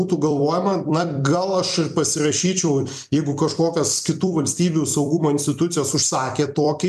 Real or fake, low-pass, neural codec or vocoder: fake; 14.4 kHz; vocoder, 44.1 kHz, 128 mel bands every 256 samples, BigVGAN v2